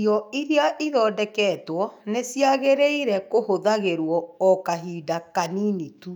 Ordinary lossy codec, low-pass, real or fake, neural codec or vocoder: none; 19.8 kHz; fake; autoencoder, 48 kHz, 128 numbers a frame, DAC-VAE, trained on Japanese speech